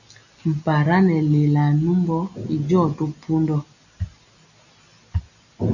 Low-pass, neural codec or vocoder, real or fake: 7.2 kHz; none; real